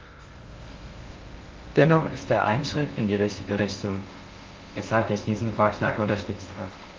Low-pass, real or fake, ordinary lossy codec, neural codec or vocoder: 7.2 kHz; fake; Opus, 32 kbps; codec, 16 kHz in and 24 kHz out, 0.6 kbps, FocalCodec, streaming, 2048 codes